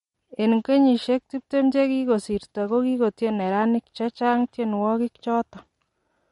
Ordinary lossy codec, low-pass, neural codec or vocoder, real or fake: MP3, 48 kbps; 19.8 kHz; none; real